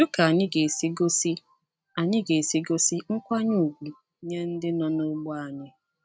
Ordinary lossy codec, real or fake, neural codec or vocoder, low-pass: none; real; none; none